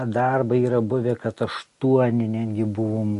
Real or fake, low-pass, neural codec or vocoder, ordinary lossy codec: real; 14.4 kHz; none; MP3, 48 kbps